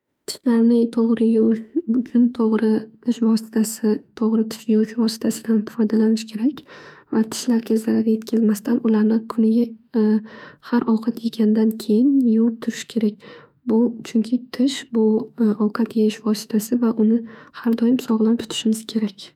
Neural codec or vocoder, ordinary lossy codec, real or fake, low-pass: autoencoder, 48 kHz, 32 numbers a frame, DAC-VAE, trained on Japanese speech; none; fake; 19.8 kHz